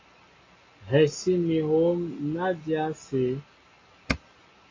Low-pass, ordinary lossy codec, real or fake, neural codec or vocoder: 7.2 kHz; AAC, 32 kbps; real; none